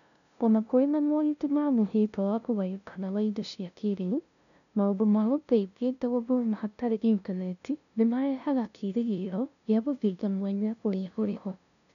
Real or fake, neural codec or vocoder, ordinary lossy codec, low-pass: fake; codec, 16 kHz, 0.5 kbps, FunCodec, trained on LibriTTS, 25 frames a second; none; 7.2 kHz